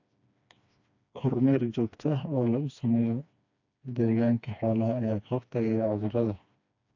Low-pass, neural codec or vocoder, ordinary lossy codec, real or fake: 7.2 kHz; codec, 16 kHz, 2 kbps, FreqCodec, smaller model; AAC, 48 kbps; fake